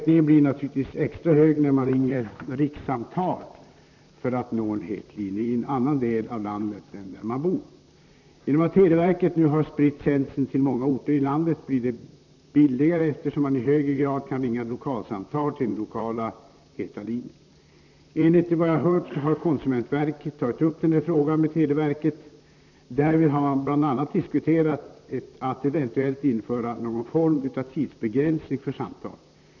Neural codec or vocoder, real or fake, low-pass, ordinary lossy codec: vocoder, 44.1 kHz, 128 mel bands, Pupu-Vocoder; fake; 7.2 kHz; none